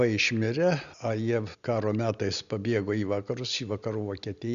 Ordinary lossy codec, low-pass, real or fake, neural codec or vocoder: Opus, 64 kbps; 7.2 kHz; real; none